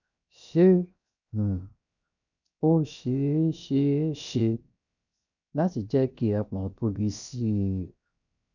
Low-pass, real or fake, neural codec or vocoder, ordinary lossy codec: 7.2 kHz; fake; codec, 16 kHz, 0.7 kbps, FocalCodec; none